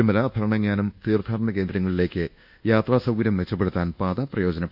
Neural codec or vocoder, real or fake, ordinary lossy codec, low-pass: codec, 24 kHz, 1.2 kbps, DualCodec; fake; none; 5.4 kHz